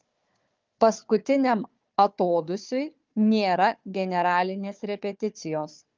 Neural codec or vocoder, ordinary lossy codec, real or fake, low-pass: codec, 16 kHz, 4 kbps, FunCodec, trained on Chinese and English, 50 frames a second; Opus, 24 kbps; fake; 7.2 kHz